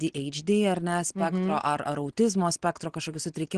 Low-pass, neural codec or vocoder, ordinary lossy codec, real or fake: 9.9 kHz; none; Opus, 16 kbps; real